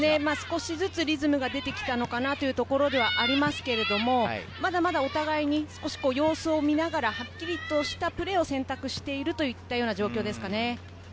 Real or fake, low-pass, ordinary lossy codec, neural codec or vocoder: real; none; none; none